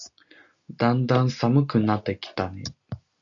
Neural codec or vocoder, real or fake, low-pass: none; real; 7.2 kHz